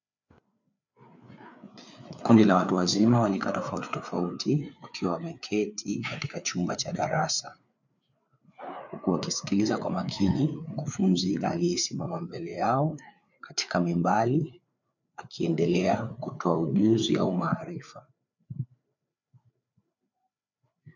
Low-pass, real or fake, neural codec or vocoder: 7.2 kHz; fake; codec, 16 kHz, 4 kbps, FreqCodec, larger model